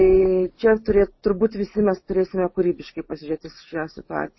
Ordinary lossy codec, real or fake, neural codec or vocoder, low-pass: MP3, 24 kbps; real; none; 7.2 kHz